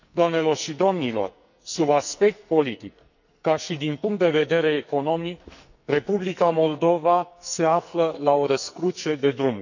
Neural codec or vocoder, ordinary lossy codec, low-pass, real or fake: codec, 44.1 kHz, 2.6 kbps, SNAC; none; 7.2 kHz; fake